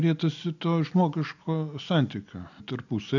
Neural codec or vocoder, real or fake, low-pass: none; real; 7.2 kHz